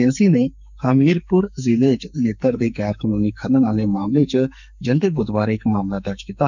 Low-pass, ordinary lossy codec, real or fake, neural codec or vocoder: 7.2 kHz; none; fake; codec, 44.1 kHz, 2.6 kbps, SNAC